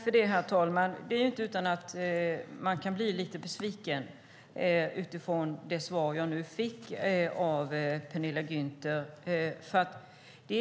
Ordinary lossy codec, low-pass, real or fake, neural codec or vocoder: none; none; real; none